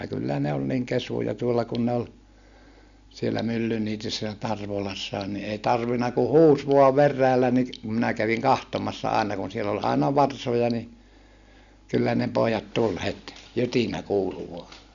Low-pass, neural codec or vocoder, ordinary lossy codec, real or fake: 7.2 kHz; none; Opus, 64 kbps; real